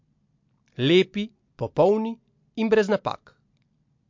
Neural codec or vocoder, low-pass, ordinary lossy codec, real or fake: none; 7.2 kHz; MP3, 48 kbps; real